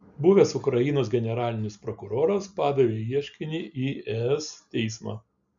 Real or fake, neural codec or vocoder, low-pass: real; none; 7.2 kHz